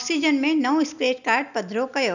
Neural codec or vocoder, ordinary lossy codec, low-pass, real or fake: none; none; 7.2 kHz; real